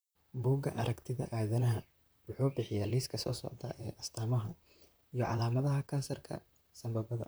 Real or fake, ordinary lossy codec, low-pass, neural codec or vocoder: fake; none; none; vocoder, 44.1 kHz, 128 mel bands, Pupu-Vocoder